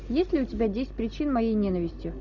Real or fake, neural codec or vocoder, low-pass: real; none; 7.2 kHz